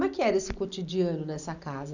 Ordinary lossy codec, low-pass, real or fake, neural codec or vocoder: none; 7.2 kHz; real; none